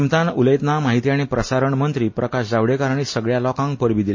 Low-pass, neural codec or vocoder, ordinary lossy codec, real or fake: 7.2 kHz; none; MP3, 32 kbps; real